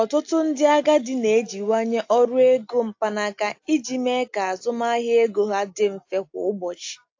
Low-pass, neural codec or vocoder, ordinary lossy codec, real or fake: 7.2 kHz; none; AAC, 48 kbps; real